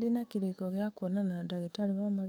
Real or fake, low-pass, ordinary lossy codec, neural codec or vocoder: fake; none; none; codec, 44.1 kHz, 7.8 kbps, DAC